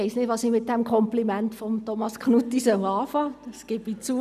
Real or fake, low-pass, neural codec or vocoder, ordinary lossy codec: real; 14.4 kHz; none; none